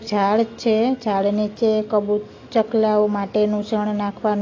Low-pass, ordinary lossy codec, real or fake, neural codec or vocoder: 7.2 kHz; AAC, 32 kbps; real; none